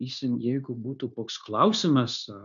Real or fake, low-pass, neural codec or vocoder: fake; 7.2 kHz; codec, 16 kHz, 0.9 kbps, LongCat-Audio-Codec